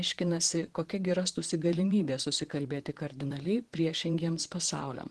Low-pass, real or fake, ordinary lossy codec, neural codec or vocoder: 10.8 kHz; fake; Opus, 16 kbps; vocoder, 44.1 kHz, 128 mel bands, Pupu-Vocoder